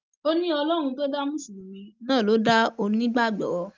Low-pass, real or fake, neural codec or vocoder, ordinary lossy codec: 7.2 kHz; real; none; Opus, 24 kbps